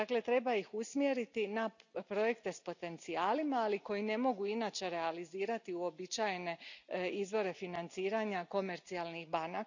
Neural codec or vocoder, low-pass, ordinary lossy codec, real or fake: none; 7.2 kHz; none; real